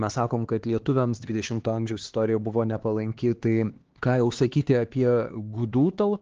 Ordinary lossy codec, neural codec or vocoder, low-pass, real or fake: Opus, 16 kbps; codec, 16 kHz, 2 kbps, X-Codec, HuBERT features, trained on LibriSpeech; 7.2 kHz; fake